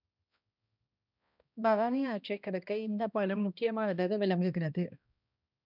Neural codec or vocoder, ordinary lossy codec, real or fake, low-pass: codec, 16 kHz, 1 kbps, X-Codec, HuBERT features, trained on balanced general audio; none; fake; 5.4 kHz